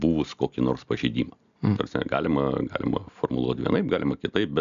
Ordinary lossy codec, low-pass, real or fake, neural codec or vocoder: AAC, 96 kbps; 7.2 kHz; real; none